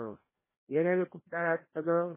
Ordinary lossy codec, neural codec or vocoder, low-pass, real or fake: MP3, 16 kbps; codec, 16 kHz, 1 kbps, FreqCodec, larger model; 3.6 kHz; fake